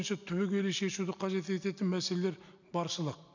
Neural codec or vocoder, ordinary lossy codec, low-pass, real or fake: none; none; 7.2 kHz; real